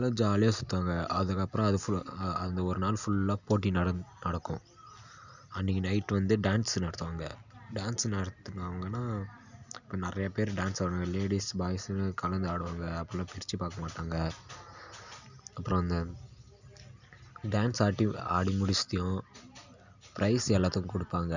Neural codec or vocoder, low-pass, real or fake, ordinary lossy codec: none; 7.2 kHz; real; none